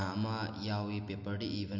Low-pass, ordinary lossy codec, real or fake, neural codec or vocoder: 7.2 kHz; none; real; none